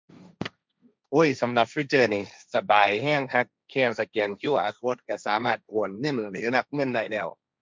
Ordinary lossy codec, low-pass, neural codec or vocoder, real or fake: none; none; codec, 16 kHz, 1.1 kbps, Voila-Tokenizer; fake